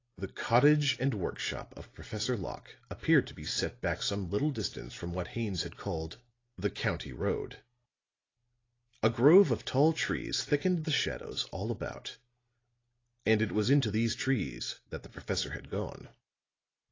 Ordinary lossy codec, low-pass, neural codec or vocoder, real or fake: AAC, 32 kbps; 7.2 kHz; none; real